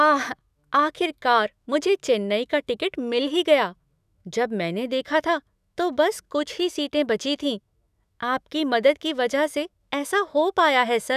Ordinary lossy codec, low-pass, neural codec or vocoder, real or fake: none; 14.4 kHz; autoencoder, 48 kHz, 128 numbers a frame, DAC-VAE, trained on Japanese speech; fake